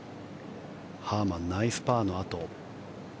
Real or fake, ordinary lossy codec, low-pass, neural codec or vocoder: real; none; none; none